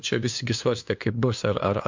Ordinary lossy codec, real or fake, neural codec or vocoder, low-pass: MP3, 64 kbps; fake; codec, 16 kHz, 2 kbps, FunCodec, trained on LibriTTS, 25 frames a second; 7.2 kHz